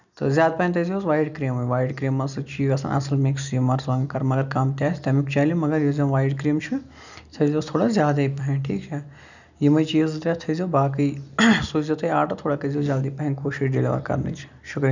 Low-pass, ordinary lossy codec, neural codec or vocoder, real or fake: 7.2 kHz; none; none; real